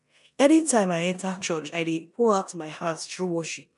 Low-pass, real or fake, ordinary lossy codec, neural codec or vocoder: 10.8 kHz; fake; none; codec, 16 kHz in and 24 kHz out, 0.9 kbps, LongCat-Audio-Codec, four codebook decoder